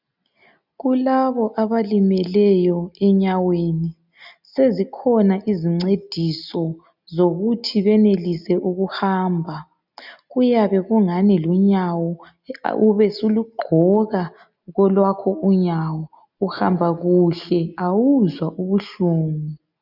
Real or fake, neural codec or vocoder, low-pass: real; none; 5.4 kHz